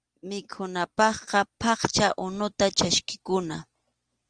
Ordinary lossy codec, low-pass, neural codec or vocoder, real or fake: Opus, 24 kbps; 9.9 kHz; none; real